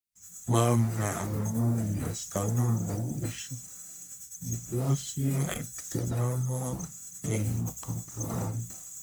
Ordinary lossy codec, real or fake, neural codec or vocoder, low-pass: none; fake; codec, 44.1 kHz, 1.7 kbps, Pupu-Codec; none